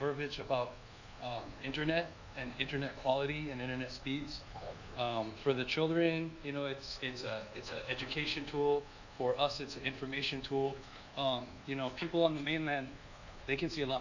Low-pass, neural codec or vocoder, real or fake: 7.2 kHz; codec, 24 kHz, 1.2 kbps, DualCodec; fake